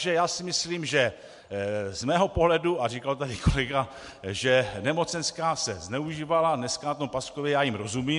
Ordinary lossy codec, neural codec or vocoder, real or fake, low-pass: MP3, 64 kbps; none; real; 10.8 kHz